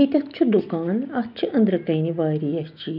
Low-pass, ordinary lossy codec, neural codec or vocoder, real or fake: 5.4 kHz; none; none; real